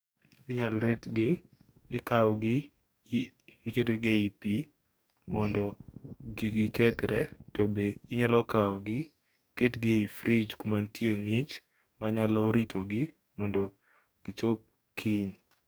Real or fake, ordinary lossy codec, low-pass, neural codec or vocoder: fake; none; none; codec, 44.1 kHz, 2.6 kbps, DAC